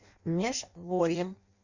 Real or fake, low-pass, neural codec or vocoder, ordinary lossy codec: fake; 7.2 kHz; codec, 16 kHz in and 24 kHz out, 0.6 kbps, FireRedTTS-2 codec; Opus, 64 kbps